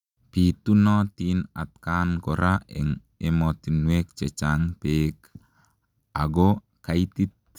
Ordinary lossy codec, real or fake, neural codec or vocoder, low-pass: none; real; none; 19.8 kHz